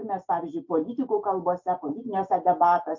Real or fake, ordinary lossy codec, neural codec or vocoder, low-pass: real; MP3, 48 kbps; none; 7.2 kHz